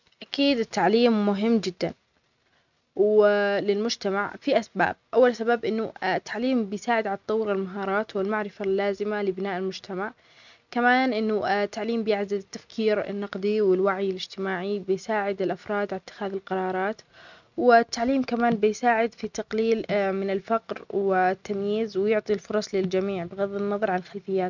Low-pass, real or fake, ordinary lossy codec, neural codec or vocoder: 7.2 kHz; real; none; none